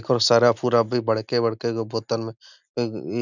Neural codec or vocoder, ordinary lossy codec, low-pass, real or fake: none; none; 7.2 kHz; real